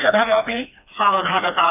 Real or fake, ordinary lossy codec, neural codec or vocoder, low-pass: fake; none; codec, 16 kHz, 2 kbps, FreqCodec, smaller model; 3.6 kHz